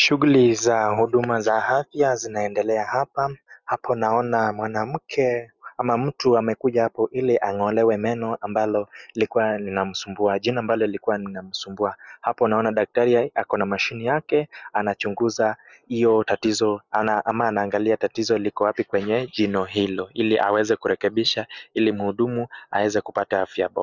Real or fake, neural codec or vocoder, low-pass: real; none; 7.2 kHz